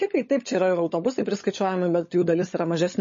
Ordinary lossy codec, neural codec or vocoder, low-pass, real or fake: MP3, 32 kbps; codec, 16 kHz, 16 kbps, FunCodec, trained on LibriTTS, 50 frames a second; 7.2 kHz; fake